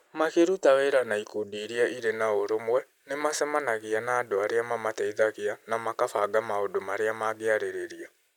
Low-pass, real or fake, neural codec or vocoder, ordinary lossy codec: 19.8 kHz; real; none; none